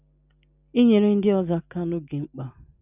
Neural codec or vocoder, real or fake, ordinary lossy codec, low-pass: none; real; none; 3.6 kHz